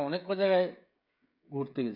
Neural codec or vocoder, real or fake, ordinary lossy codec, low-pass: codec, 16 kHz, 16 kbps, FreqCodec, smaller model; fake; none; 5.4 kHz